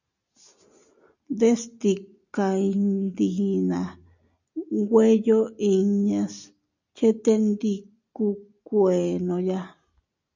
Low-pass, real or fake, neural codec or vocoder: 7.2 kHz; real; none